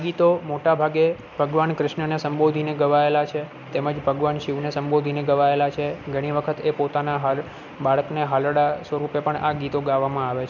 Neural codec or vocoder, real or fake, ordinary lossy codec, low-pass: none; real; none; 7.2 kHz